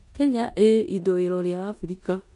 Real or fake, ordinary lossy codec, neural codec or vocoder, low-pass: fake; none; codec, 16 kHz in and 24 kHz out, 0.9 kbps, LongCat-Audio-Codec, four codebook decoder; 10.8 kHz